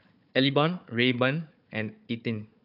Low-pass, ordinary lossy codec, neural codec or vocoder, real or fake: 5.4 kHz; none; codec, 16 kHz, 4 kbps, FunCodec, trained on Chinese and English, 50 frames a second; fake